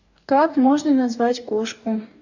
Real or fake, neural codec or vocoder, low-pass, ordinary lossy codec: fake; codec, 44.1 kHz, 2.6 kbps, DAC; 7.2 kHz; none